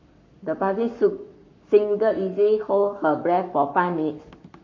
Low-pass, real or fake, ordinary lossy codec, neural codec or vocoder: 7.2 kHz; fake; MP3, 48 kbps; codec, 44.1 kHz, 7.8 kbps, Pupu-Codec